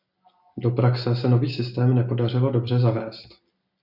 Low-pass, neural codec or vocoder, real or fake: 5.4 kHz; none; real